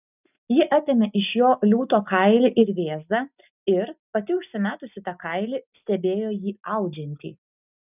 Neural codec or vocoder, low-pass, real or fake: none; 3.6 kHz; real